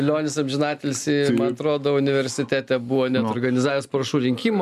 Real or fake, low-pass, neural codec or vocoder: real; 14.4 kHz; none